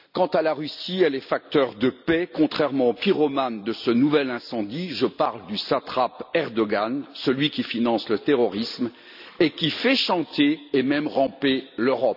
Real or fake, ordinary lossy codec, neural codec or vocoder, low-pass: real; none; none; 5.4 kHz